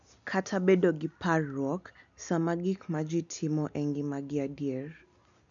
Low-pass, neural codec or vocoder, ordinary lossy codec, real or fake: 7.2 kHz; none; none; real